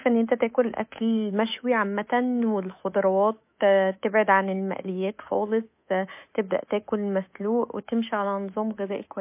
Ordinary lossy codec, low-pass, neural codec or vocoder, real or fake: MP3, 24 kbps; 3.6 kHz; codec, 24 kHz, 3.1 kbps, DualCodec; fake